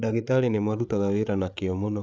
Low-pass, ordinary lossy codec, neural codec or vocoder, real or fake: none; none; codec, 16 kHz, 8 kbps, FreqCodec, larger model; fake